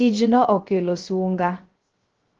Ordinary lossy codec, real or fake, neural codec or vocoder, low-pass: Opus, 16 kbps; fake; codec, 16 kHz, 0.3 kbps, FocalCodec; 7.2 kHz